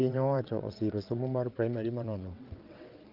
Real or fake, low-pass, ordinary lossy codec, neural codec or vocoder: fake; 5.4 kHz; Opus, 32 kbps; vocoder, 22.05 kHz, 80 mel bands, WaveNeXt